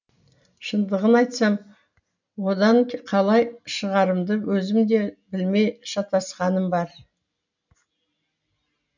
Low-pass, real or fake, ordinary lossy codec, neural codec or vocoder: 7.2 kHz; real; none; none